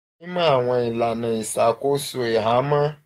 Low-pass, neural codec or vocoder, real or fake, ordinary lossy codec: 19.8 kHz; codec, 44.1 kHz, 7.8 kbps, DAC; fake; AAC, 48 kbps